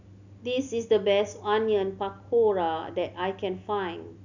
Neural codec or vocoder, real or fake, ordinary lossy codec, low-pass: none; real; none; 7.2 kHz